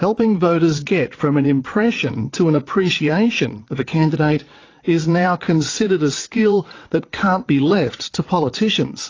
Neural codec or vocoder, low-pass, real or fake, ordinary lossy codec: vocoder, 22.05 kHz, 80 mel bands, WaveNeXt; 7.2 kHz; fake; AAC, 32 kbps